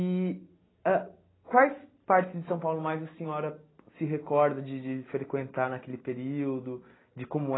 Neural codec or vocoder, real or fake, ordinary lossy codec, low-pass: none; real; AAC, 16 kbps; 7.2 kHz